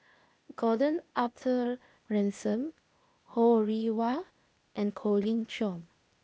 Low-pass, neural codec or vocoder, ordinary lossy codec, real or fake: none; codec, 16 kHz, 0.8 kbps, ZipCodec; none; fake